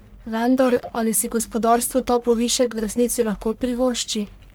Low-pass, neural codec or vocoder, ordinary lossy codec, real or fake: none; codec, 44.1 kHz, 1.7 kbps, Pupu-Codec; none; fake